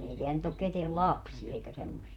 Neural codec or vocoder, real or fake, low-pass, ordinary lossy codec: vocoder, 44.1 kHz, 128 mel bands, Pupu-Vocoder; fake; 19.8 kHz; none